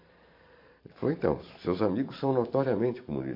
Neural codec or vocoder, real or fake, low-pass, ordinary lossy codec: vocoder, 44.1 kHz, 128 mel bands every 512 samples, BigVGAN v2; fake; 5.4 kHz; MP3, 32 kbps